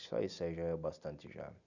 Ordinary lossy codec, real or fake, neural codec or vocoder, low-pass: none; real; none; 7.2 kHz